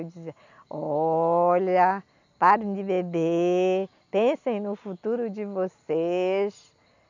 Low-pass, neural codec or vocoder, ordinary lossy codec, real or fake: 7.2 kHz; none; none; real